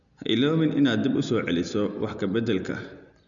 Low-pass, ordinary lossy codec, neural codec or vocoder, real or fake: 7.2 kHz; none; none; real